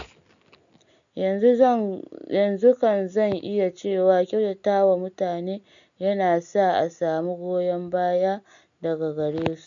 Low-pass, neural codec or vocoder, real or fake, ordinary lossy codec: 7.2 kHz; none; real; none